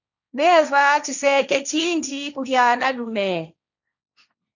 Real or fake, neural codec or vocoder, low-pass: fake; codec, 16 kHz, 1.1 kbps, Voila-Tokenizer; 7.2 kHz